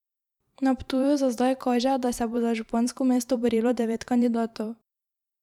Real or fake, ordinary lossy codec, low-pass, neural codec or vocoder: fake; none; 19.8 kHz; vocoder, 44.1 kHz, 128 mel bands every 512 samples, BigVGAN v2